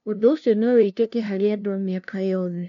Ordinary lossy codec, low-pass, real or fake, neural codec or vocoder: none; 7.2 kHz; fake; codec, 16 kHz, 0.5 kbps, FunCodec, trained on LibriTTS, 25 frames a second